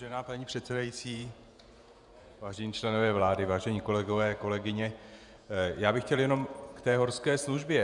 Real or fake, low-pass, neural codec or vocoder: fake; 10.8 kHz; vocoder, 48 kHz, 128 mel bands, Vocos